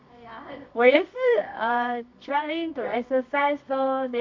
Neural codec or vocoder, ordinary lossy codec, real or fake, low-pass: codec, 24 kHz, 0.9 kbps, WavTokenizer, medium music audio release; MP3, 48 kbps; fake; 7.2 kHz